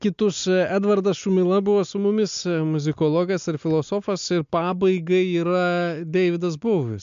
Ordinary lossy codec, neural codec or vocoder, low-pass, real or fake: MP3, 64 kbps; none; 7.2 kHz; real